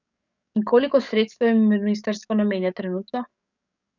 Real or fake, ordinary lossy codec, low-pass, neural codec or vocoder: fake; none; 7.2 kHz; codec, 44.1 kHz, 7.8 kbps, DAC